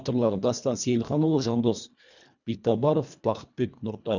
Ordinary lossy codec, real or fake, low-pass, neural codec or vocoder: none; fake; 7.2 kHz; codec, 24 kHz, 1.5 kbps, HILCodec